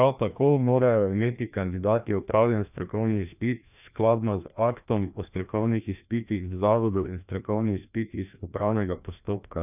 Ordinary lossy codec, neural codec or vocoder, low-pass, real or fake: none; codec, 16 kHz, 1 kbps, FreqCodec, larger model; 3.6 kHz; fake